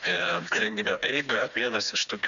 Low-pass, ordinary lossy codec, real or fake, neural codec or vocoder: 7.2 kHz; MP3, 96 kbps; fake; codec, 16 kHz, 2 kbps, FreqCodec, smaller model